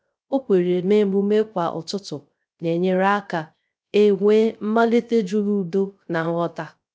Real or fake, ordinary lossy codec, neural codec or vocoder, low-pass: fake; none; codec, 16 kHz, 0.3 kbps, FocalCodec; none